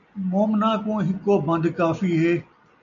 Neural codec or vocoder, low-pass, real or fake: none; 7.2 kHz; real